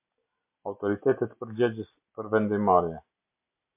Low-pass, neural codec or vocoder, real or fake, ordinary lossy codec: 3.6 kHz; none; real; MP3, 32 kbps